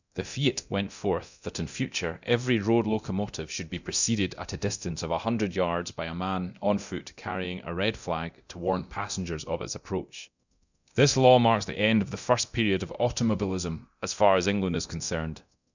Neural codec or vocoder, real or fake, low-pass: codec, 24 kHz, 0.9 kbps, DualCodec; fake; 7.2 kHz